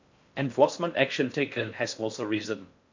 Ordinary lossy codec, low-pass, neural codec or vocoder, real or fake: MP3, 64 kbps; 7.2 kHz; codec, 16 kHz in and 24 kHz out, 0.6 kbps, FocalCodec, streaming, 4096 codes; fake